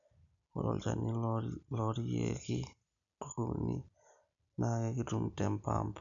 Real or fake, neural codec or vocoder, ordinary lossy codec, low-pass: real; none; none; 7.2 kHz